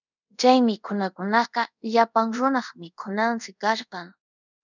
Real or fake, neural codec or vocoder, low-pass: fake; codec, 24 kHz, 0.5 kbps, DualCodec; 7.2 kHz